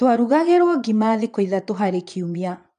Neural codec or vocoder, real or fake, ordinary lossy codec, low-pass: vocoder, 22.05 kHz, 80 mel bands, Vocos; fake; MP3, 96 kbps; 9.9 kHz